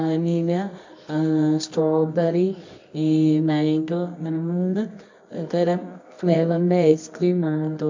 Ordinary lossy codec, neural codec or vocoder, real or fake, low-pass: MP3, 64 kbps; codec, 24 kHz, 0.9 kbps, WavTokenizer, medium music audio release; fake; 7.2 kHz